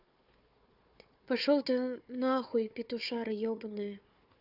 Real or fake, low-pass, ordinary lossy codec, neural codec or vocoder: fake; 5.4 kHz; AAC, 48 kbps; codec, 16 kHz, 4 kbps, FunCodec, trained on Chinese and English, 50 frames a second